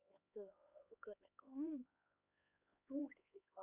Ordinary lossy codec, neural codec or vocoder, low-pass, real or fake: none; codec, 16 kHz, 4 kbps, X-Codec, HuBERT features, trained on LibriSpeech; 3.6 kHz; fake